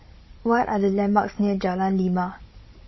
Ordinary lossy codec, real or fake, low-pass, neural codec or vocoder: MP3, 24 kbps; fake; 7.2 kHz; codec, 16 kHz, 16 kbps, FunCodec, trained on Chinese and English, 50 frames a second